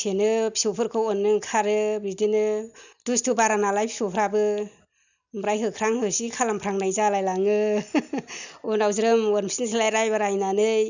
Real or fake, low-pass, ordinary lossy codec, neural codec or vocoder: real; 7.2 kHz; none; none